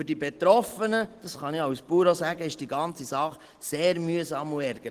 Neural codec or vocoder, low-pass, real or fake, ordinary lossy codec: none; 14.4 kHz; real; Opus, 16 kbps